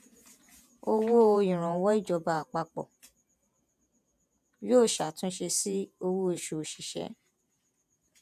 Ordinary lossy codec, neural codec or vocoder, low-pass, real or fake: none; vocoder, 48 kHz, 128 mel bands, Vocos; 14.4 kHz; fake